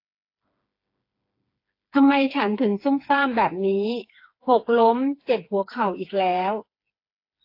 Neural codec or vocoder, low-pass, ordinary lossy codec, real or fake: codec, 16 kHz, 4 kbps, FreqCodec, smaller model; 5.4 kHz; AAC, 32 kbps; fake